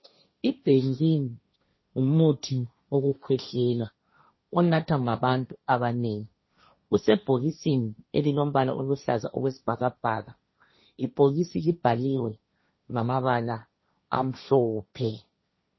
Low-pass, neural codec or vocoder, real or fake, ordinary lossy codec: 7.2 kHz; codec, 16 kHz, 1.1 kbps, Voila-Tokenizer; fake; MP3, 24 kbps